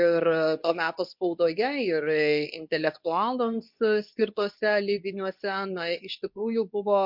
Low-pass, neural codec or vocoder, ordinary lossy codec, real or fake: 5.4 kHz; codec, 24 kHz, 0.9 kbps, WavTokenizer, medium speech release version 2; MP3, 48 kbps; fake